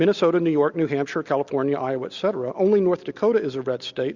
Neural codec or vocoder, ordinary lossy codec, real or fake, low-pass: none; Opus, 64 kbps; real; 7.2 kHz